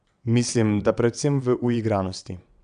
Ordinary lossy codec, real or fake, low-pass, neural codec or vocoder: none; fake; 9.9 kHz; vocoder, 22.05 kHz, 80 mel bands, WaveNeXt